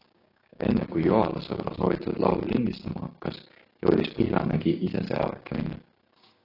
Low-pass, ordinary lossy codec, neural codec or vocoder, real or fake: 5.4 kHz; AAC, 32 kbps; codec, 16 kHz, 6 kbps, DAC; fake